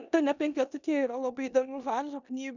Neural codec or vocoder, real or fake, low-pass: codec, 16 kHz in and 24 kHz out, 0.9 kbps, LongCat-Audio-Codec, four codebook decoder; fake; 7.2 kHz